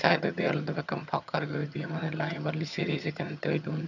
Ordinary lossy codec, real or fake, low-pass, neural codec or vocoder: none; fake; 7.2 kHz; vocoder, 22.05 kHz, 80 mel bands, HiFi-GAN